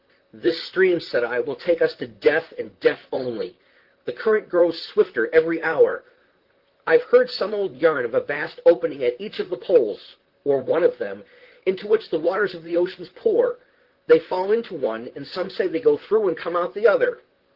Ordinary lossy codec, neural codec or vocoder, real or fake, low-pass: Opus, 16 kbps; vocoder, 44.1 kHz, 128 mel bands, Pupu-Vocoder; fake; 5.4 kHz